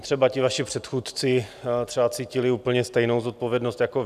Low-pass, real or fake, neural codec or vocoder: 14.4 kHz; real; none